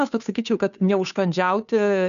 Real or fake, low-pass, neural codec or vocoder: fake; 7.2 kHz; codec, 16 kHz, 2 kbps, FunCodec, trained on Chinese and English, 25 frames a second